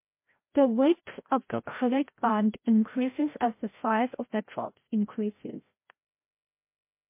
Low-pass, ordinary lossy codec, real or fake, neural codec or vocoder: 3.6 kHz; MP3, 24 kbps; fake; codec, 16 kHz, 0.5 kbps, FreqCodec, larger model